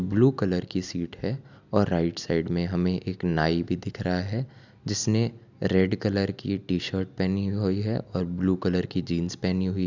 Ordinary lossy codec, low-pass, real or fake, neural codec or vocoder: none; 7.2 kHz; real; none